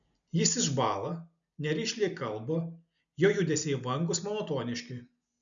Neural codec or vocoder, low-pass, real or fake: none; 7.2 kHz; real